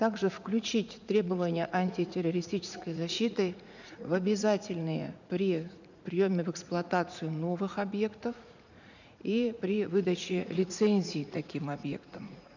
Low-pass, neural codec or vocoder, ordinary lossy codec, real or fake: 7.2 kHz; vocoder, 44.1 kHz, 80 mel bands, Vocos; none; fake